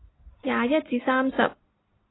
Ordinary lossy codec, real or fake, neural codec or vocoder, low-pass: AAC, 16 kbps; real; none; 7.2 kHz